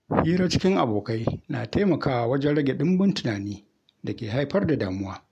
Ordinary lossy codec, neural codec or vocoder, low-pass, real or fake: MP3, 96 kbps; none; 14.4 kHz; real